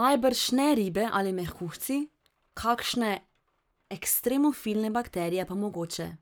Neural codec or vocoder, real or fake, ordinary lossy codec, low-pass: codec, 44.1 kHz, 7.8 kbps, Pupu-Codec; fake; none; none